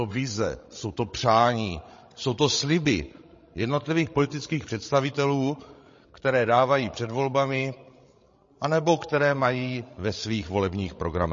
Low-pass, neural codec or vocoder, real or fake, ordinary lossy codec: 7.2 kHz; codec, 16 kHz, 16 kbps, FreqCodec, larger model; fake; MP3, 32 kbps